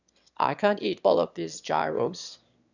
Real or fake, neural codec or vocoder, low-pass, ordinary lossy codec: fake; autoencoder, 22.05 kHz, a latent of 192 numbers a frame, VITS, trained on one speaker; 7.2 kHz; none